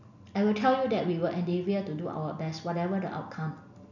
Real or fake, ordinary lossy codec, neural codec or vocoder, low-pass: real; none; none; 7.2 kHz